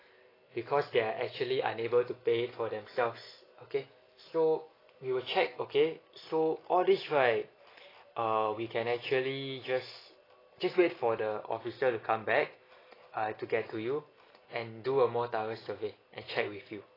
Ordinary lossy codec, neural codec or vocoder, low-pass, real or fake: AAC, 24 kbps; none; 5.4 kHz; real